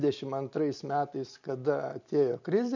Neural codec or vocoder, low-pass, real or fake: none; 7.2 kHz; real